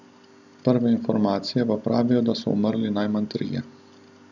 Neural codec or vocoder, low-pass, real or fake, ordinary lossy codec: none; 7.2 kHz; real; none